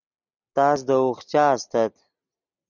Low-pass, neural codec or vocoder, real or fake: 7.2 kHz; none; real